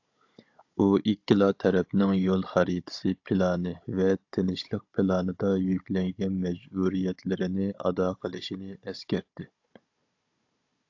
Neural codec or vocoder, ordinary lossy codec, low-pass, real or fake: codec, 16 kHz, 16 kbps, FunCodec, trained on Chinese and English, 50 frames a second; AAC, 48 kbps; 7.2 kHz; fake